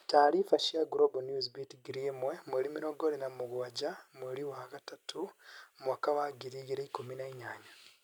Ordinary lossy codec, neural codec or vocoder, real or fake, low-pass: none; none; real; none